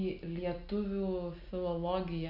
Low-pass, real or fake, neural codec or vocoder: 5.4 kHz; real; none